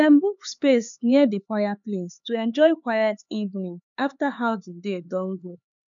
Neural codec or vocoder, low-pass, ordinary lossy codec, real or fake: codec, 16 kHz, 4 kbps, X-Codec, HuBERT features, trained on LibriSpeech; 7.2 kHz; none; fake